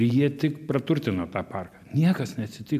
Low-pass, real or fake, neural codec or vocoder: 14.4 kHz; real; none